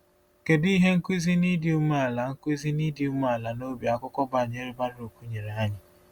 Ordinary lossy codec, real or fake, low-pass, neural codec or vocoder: Opus, 64 kbps; real; 19.8 kHz; none